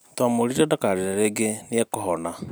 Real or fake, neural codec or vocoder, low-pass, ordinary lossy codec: real; none; none; none